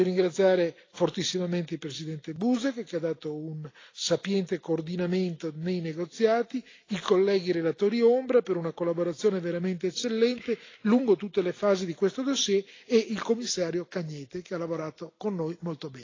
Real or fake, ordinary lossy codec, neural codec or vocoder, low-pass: real; AAC, 32 kbps; none; 7.2 kHz